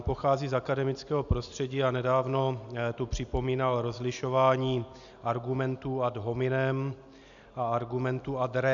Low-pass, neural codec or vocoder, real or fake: 7.2 kHz; none; real